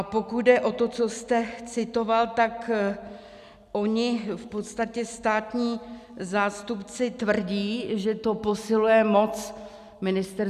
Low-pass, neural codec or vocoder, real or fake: 14.4 kHz; none; real